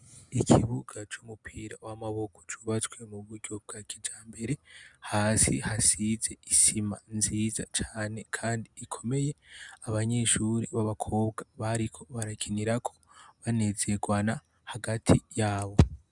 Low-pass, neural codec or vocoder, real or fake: 10.8 kHz; none; real